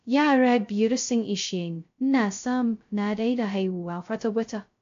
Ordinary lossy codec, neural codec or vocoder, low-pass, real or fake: AAC, 48 kbps; codec, 16 kHz, 0.2 kbps, FocalCodec; 7.2 kHz; fake